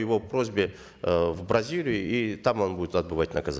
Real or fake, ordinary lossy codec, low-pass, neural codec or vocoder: real; none; none; none